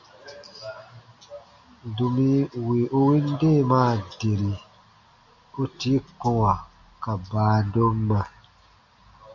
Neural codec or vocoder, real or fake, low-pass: none; real; 7.2 kHz